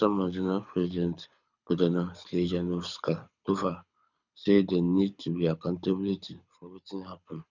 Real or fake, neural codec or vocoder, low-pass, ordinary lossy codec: fake; codec, 24 kHz, 6 kbps, HILCodec; 7.2 kHz; none